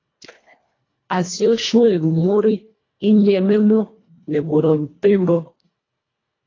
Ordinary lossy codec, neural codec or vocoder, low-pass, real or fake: AAC, 32 kbps; codec, 24 kHz, 1.5 kbps, HILCodec; 7.2 kHz; fake